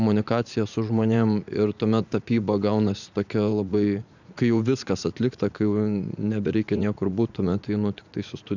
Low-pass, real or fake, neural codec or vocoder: 7.2 kHz; fake; vocoder, 44.1 kHz, 80 mel bands, Vocos